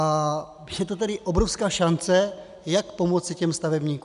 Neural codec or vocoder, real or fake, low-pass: none; real; 10.8 kHz